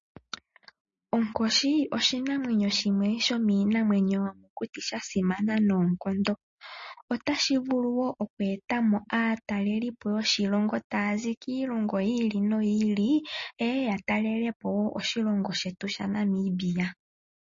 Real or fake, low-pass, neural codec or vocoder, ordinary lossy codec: real; 7.2 kHz; none; MP3, 32 kbps